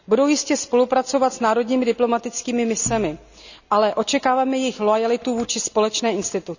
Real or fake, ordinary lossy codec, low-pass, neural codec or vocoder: real; none; 7.2 kHz; none